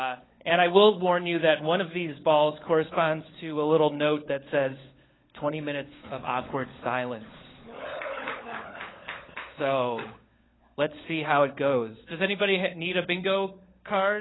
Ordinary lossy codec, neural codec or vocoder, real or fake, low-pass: AAC, 16 kbps; codec, 16 kHz, 16 kbps, FunCodec, trained on LibriTTS, 50 frames a second; fake; 7.2 kHz